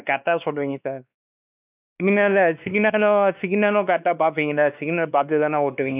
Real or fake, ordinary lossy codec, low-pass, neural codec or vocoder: fake; none; 3.6 kHz; codec, 16 kHz, 2 kbps, X-Codec, WavLM features, trained on Multilingual LibriSpeech